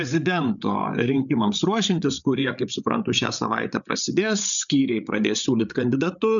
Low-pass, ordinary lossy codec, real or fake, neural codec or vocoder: 7.2 kHz; MP3, 96 kbps; fake; codec, 16 kHz, 8 kbps, FreqCodec, larger model